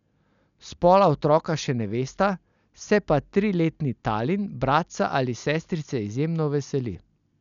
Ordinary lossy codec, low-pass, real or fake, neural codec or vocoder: none; 7.2 kHz; real; none